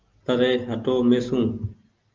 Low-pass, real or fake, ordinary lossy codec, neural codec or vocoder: 7.2 kHz; real; Opus, 32 kbps; none